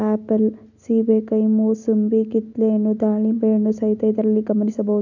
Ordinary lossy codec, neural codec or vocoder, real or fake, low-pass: none; none; real; 7.2 kHz